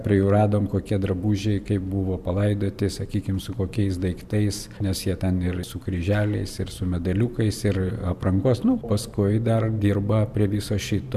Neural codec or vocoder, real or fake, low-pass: vocoder, 44.1 kHz, 128 mel bands every 512 samples, BigVGAN v2; fake; 14.4 kHz